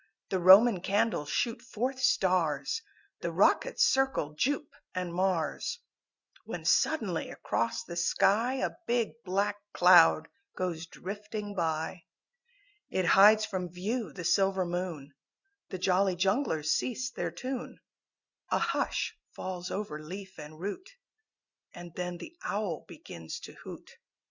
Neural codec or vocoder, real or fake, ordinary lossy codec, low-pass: none; real; Opus, 64 kbps; 7.2 kHz